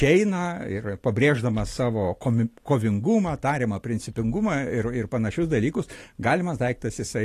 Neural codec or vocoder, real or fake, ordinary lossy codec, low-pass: none; real; AAC, 48 kbps; 14.4 kHz